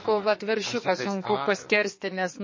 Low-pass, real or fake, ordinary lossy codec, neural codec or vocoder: 7.2 kHz; fake; MP3, 32 kbps; codec, 44.1 kHz, 2.6 kbps, SNAC